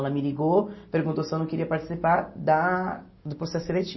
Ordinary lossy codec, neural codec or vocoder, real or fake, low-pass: MP3, 24 kbps; none; real; 7.2 kHz